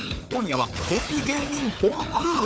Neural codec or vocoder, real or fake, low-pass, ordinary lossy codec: codec, 16 kHz, 4 kbps, FunCodec, trained on Chinese and English, 50 frames a second; fake; none; none